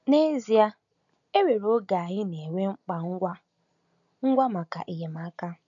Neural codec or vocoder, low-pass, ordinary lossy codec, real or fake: none; 7.2 kHz; none; real